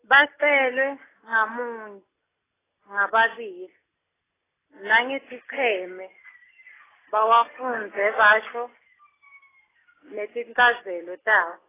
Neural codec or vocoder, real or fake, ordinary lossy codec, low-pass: none; real; AAC, 16 kbps; 3.6 kHz